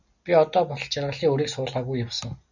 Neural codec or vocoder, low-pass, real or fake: none; 7.2 kHz; real